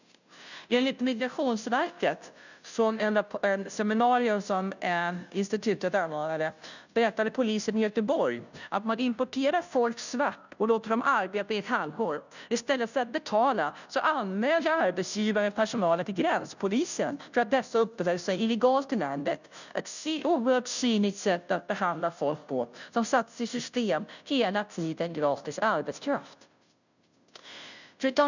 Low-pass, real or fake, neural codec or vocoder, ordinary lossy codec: 7.2 kHz; fake; codec, 16 kHz, 0.5 kbps, FunCodec, trained on Chinese and English, 25 frames a second; none